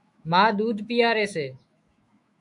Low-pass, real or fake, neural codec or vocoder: 10.8 kHz; fake; autoencoder, 48 kHz, 128 numbers a frame, DAC-VAE, trained on Japanese speech